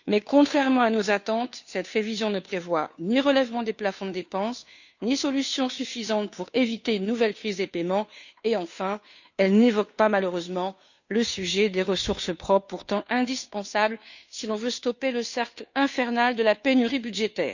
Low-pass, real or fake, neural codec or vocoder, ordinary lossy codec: 7.2 kHz; fake; codec, 16 kHz, 2 kbps, FunCodec, trained on Chinese and English, 25 frames a second; none